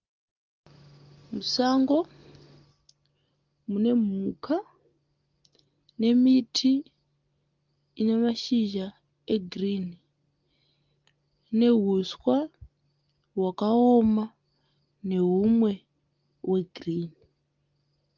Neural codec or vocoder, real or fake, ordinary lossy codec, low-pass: none; real; Opus, 32 kbps; 7.2 kHz